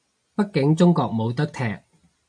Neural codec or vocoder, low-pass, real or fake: none; 9.9 kHz; real